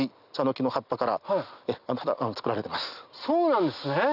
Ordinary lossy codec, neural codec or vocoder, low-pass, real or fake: none; none; 5.4 kHz; real